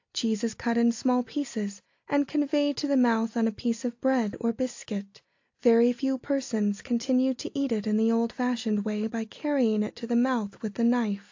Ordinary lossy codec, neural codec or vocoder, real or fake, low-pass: AAC, 48 kbps; none; real; 7.2 kHz